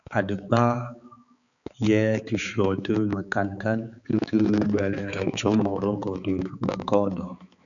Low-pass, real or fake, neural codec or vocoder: 7.2 kHz; fake; codec, 16 kHz, 4 kbps, X-Codec, HuBERT features, trained on balanced general audio